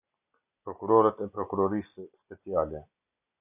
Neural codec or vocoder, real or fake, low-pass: none; real; 3.6 kHz